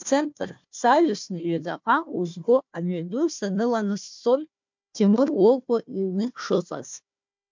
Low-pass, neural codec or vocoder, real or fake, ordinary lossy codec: 7.2 kHz; codec, 16 kHz, 1 kbps, FunCodec, trained on Chinese and English, 50 frames a second; fake; MP3, 64 kbps